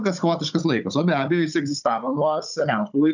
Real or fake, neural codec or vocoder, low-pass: fake; codec, 16 kHz, 16 kbps, FunCodec, trained on Chinese and English, 50 frames a second; 7.2 kHz